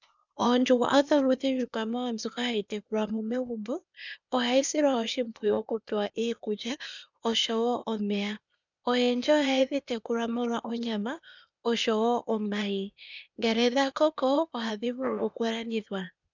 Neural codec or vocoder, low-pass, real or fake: codec, 16 kHz, 0.8 kbps, ZipCodec; 7.2 kHz; fake